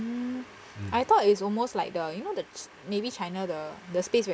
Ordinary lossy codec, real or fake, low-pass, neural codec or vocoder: none; real; none; none